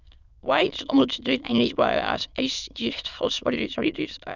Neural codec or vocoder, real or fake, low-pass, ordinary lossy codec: autoencoder, 22.05 kHz, a latent of 192 numbers a frame, VITS, trained on many speakers; fake; 7.2 kHz; none